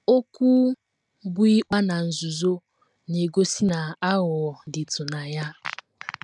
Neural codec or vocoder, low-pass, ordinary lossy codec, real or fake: none; 10.8 kHz; none; real